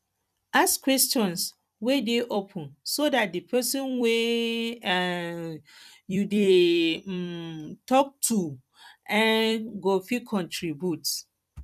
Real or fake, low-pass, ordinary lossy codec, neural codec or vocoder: fake; 14.4 kHz; none; vocoder, 44.1 kHz, 128 mel bands every 256 samples, BigVGAN v2